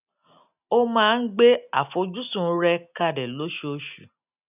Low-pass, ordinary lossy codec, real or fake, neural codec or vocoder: 3.6 kHz; none; real; none